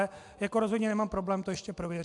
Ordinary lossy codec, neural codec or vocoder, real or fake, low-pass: AAC, 64 kbps; none; real; 10.8 kHz